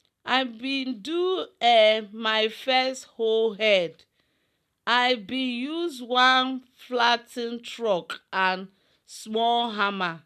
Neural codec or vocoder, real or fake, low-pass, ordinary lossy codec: none; real; 14.4 kHz; none